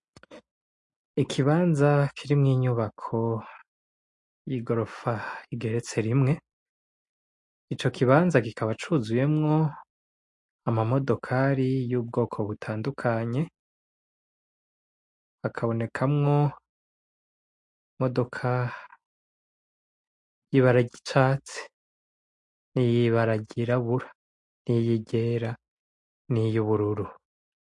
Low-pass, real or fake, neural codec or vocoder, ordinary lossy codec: 10.8 kHz; real; none; MP3, 48 kbps